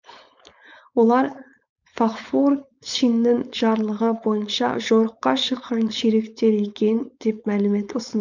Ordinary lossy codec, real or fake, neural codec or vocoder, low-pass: none; fake; codec, 16 kHz, 4.8 kbps, FACodec; 7.2 kHz